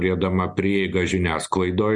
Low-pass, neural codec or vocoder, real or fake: 10.8 kHz; none; real